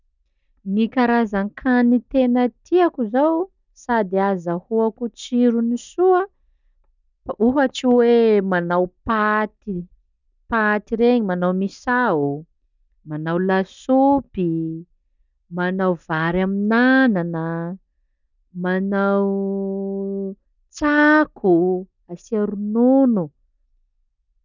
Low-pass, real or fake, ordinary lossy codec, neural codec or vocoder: 7.2 kHz; real; none; none